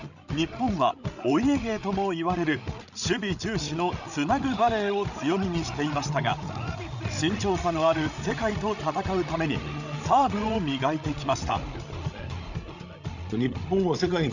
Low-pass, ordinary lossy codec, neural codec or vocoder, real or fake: 7.2 kHz; none; codec, 16 kHz, 16 kbps, FreqCodec, larger model; fake